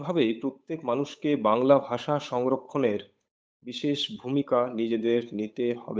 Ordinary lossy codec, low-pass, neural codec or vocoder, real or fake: none; none; codec, 16 kHz, 8 kbps, FunCodec, trained on Chinese and English, 25 frames a second; fake